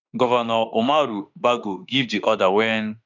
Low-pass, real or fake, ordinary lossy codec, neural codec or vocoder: 7.2 kHz; fake; none; autoencoder, 48 kHz, 32 numbers a frame, DAC-VAE, trained on Japanese speech